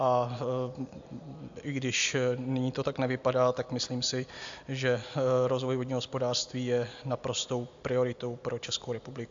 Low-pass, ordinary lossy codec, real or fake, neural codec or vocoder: 7.2 kHz; AAC, 64 kbps; real; none